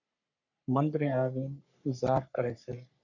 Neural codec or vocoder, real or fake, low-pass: codec, 44.1 kHz, 3.4 kbps, Pupu-Codec; fake; 7.2 kHz